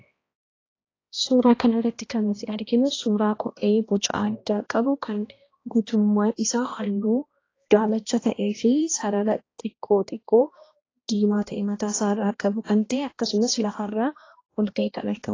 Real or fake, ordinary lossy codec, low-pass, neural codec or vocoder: fake; AAC, 32 kbps; 7.2 kHz; codec, 16 kHz, 1 kbps, X-Codec, HuBERT features, trained on balanced general audio